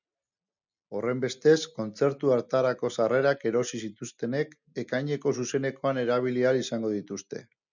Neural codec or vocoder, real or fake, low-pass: none; real; 7.2 kHz